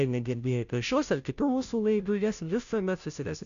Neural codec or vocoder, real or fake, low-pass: codec, 16 kHz, 0.5 kbps, FunCodec, trained on Chinese and English, 25 frames a second; fake; 7.2 kHz